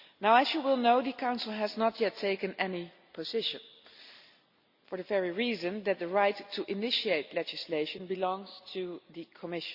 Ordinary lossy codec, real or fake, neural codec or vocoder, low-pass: Opus, 64 kbps; real; none; 5.4 kHz